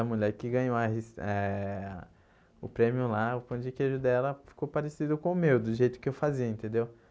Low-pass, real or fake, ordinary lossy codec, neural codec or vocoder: none; real; none; none